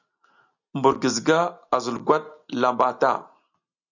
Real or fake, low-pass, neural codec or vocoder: real; 7.2 kHz; none